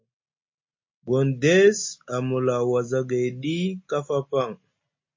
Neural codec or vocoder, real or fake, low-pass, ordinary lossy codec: none; real; 7.2 kHz; MP3, 32 kbps